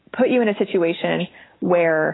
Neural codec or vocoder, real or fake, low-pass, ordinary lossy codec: none; real; 7.2 kHz; AAC, 16 kbps